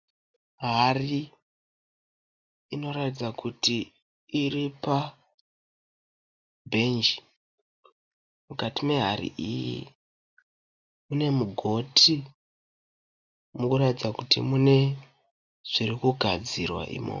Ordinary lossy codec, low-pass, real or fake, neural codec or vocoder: MP3, 64 kbps; 7.2 kHz; real; none